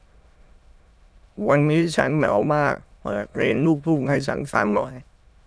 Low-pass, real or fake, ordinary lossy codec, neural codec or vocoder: none; fake; none; autoencoder, 22.05 kHz, a latent of 192 numbers a frame, VITS, trained on many speakers